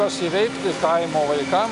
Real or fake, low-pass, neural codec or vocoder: real; 10.8 kHz; none